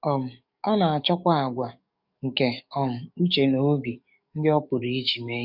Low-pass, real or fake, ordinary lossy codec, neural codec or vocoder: 5.4 kHz; fake; none; codec, 44.1 kHz, 7.8 kbps, DAC